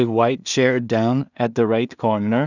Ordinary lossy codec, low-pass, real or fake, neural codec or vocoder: none; 7.2 kHz; fake; codec, 16 kHz in and 24 kHz out, 0.4 kbps, LongCat-Audio-Codec, two codebook decoder